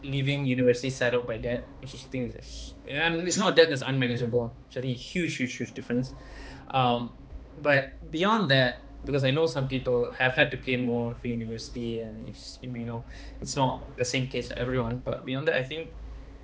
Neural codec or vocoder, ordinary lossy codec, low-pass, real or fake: codec, 16 kHz, 2 kbps, X-Codec, HuBERT features, trained on balanced general audio; none; none; fake